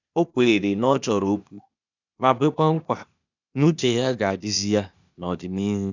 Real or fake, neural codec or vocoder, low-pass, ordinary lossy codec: fake; codec, 16 kHz, 0.8 kbps, ZipCodec; 7.2 kHz; none